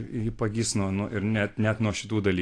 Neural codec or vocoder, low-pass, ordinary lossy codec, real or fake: none; 9.9 kHz; AAC, 48 kbps; real